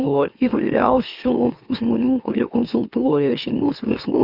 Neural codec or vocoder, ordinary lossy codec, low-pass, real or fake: autoencoder, 44.1 kHz, a latent of 192 numbers a frame, MeloTTS; Opus, 64 kbps; 5.4 kHz; fake